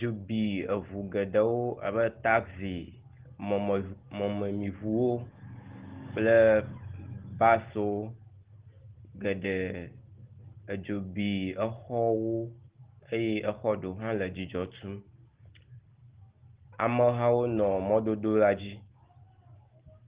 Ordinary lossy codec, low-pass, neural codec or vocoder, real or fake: Opus, 16 kbps; 3.6 kHz; none; real